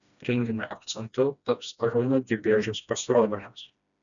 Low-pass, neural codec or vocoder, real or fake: 7.2 kHz; codec, 16 kHz, 1 kbps, FreqCodec, smaller model; fake